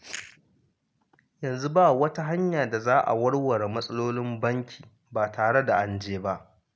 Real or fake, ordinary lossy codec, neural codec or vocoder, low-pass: real; none; none; none